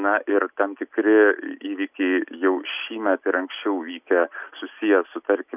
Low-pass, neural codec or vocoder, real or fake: 3.6 kHz; none; real